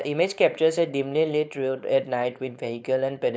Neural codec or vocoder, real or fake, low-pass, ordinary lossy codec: codec, 16 kHz, 4.8 kbps, FACodec; fake; none; none